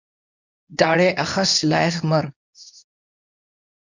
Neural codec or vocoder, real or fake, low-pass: codec, 24 kHz, 0.9 kbps, WavTokenizer, medium speech release version 2; fake; 7.2 kHz